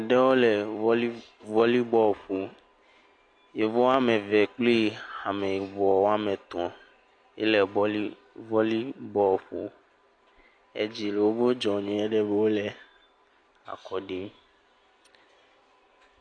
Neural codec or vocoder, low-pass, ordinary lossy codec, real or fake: none; 9.9 kHz; AAC, 48 kbps; real